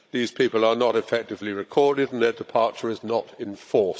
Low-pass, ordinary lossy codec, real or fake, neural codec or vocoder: none; none; fake; codec, 16 kHz, 16 kbps, FunCodec, trained on Chinese and English, 50 frames a second